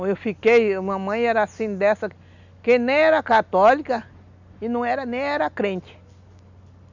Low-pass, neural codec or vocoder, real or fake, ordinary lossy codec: 7.2 kHz; none; real; none